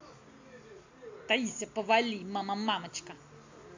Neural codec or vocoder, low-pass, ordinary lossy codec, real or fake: none; 7.2 kHz; AAC, 48 kbps; real